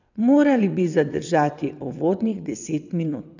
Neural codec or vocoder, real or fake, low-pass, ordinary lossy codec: vocoder, 22.05 kHz, 80 mel bands, WaveNeXt; fake; 7.2 kHz; none